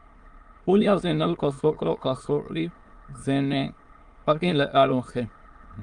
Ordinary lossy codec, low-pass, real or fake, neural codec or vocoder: Opus, 32 kbps; 9.9 kHz; fake; autoencoder, 22.05 kHz, a latent of 192 numbers a frame, VITS, trained on many speakers